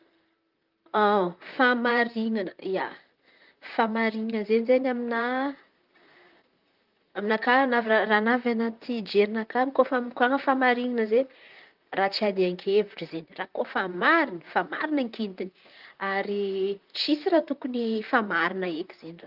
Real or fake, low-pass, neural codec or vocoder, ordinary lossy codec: fake; 5.4 kHz; vocoder, 44.1 kHz, 128 mel bands, Pupu-Vocoder; Opus, 24 kbps